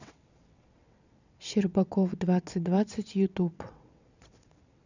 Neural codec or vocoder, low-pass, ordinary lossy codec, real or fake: vocoder, 22.05 kHz, 80 mel bands, WaveNeXt; 7.2 kHz; none; fake